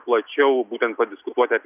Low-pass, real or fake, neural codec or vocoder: 3.6 kHz; real; none